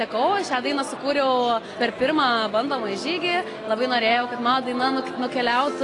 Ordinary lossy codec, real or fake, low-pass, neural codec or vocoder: AAC, 32 kbps; real; 10.8 kHz; none